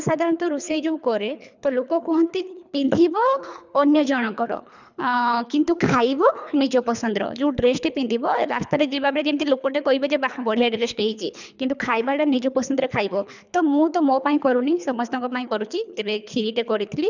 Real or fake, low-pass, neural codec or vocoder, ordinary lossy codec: fake; 7.2 kHz; codec, 24 kHz, 3 kbps, HILCodec; none